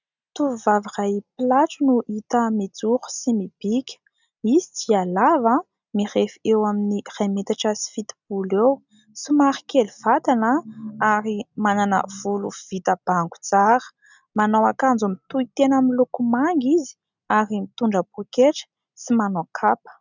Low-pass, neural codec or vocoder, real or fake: 7.2 kHz; none; real